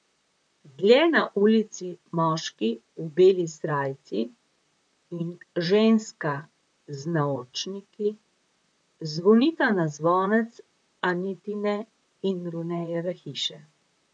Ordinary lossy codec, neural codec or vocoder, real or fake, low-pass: none; vocoder, 44.1 kHz, 128 mel bands, Pupu-Vocoder; fake; 9.9 kHz